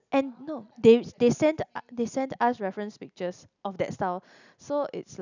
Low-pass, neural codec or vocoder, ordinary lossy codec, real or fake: 7.2 kHz; none; none; real